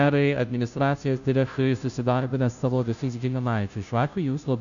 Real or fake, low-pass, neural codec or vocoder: fake; 7.2 kHz; codec, 16 kHz, 0.5 kbps, FunCodec, trained on Chinese and English, 25 frames a second